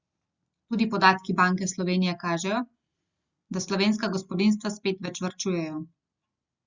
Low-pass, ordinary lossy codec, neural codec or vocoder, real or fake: 7.2 kHz; Opus, 64 kbps; none; real